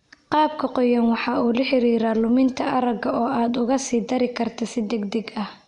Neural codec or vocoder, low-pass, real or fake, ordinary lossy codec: none; 10.8 kHz; real; MP3, 64 kbps